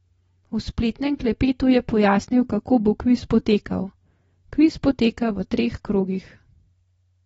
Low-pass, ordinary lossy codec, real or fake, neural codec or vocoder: 19.8 kHz; AAC, 24 kbps; real; none